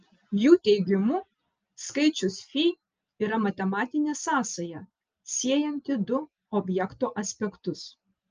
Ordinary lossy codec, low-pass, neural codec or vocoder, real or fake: Opus, 24 kbps; 7.2 kHz; none; real